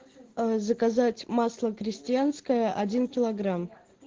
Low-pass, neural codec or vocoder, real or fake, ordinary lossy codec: 7.2 kHz; none; real; Opus, 16 kbps